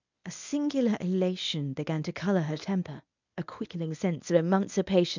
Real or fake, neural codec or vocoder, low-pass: fake; codec, 24 kHz, 0.9 kbps, WavTokenizer, medium speech release version 1; 7.2 kHz